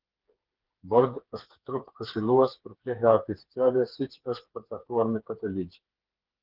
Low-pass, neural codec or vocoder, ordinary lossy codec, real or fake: 5.4 kHz; codec, 16 kHz, 4 kbps, FreqCodec, smaller model; Opus, 16 kbps; fake